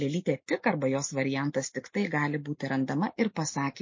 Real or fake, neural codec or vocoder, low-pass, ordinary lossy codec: real; none; 7.2 kHz; MP3, 32 kbps